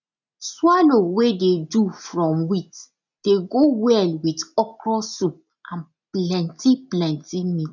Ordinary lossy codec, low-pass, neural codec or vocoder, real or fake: none; 7.2 kHz; none; real